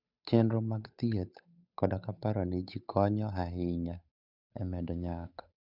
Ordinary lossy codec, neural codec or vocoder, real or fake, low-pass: none; codec, 16 kHz, 8 kbps, FunCodec, trained on Chinese and English, 25 frames a second; fake; 5.4 kHz